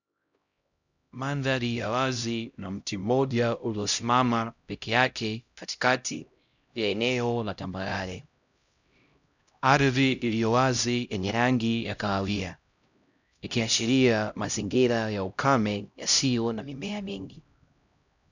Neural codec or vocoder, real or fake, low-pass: codec, 16 kHz, 0.5 kbps, X-Codec, HuBERT features, trained on LibriSpeech; fake; 7.2 kHz